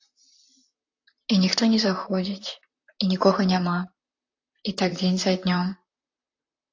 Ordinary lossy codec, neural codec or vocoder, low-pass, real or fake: AAC, 48 kbps; vocoder, 44.1 kHz, 128 mel bands, Pupu-Vocoder; 7.2 kHz; fake